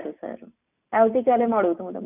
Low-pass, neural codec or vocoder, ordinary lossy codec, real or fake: 3.6 kHz; none; none; real